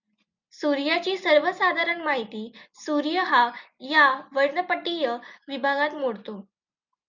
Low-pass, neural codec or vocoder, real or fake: 7.2 kHz; none; real